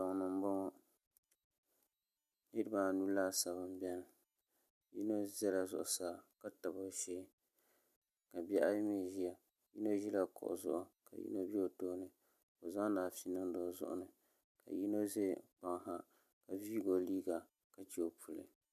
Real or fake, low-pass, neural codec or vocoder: real; 14.4 kHz; none